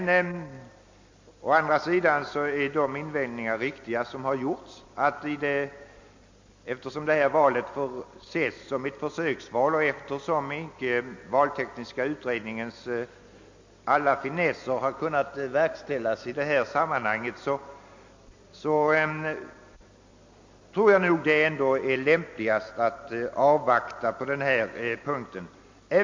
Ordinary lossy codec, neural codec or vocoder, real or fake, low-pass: MP3, 48 kbps; none; real; 7.2 kHz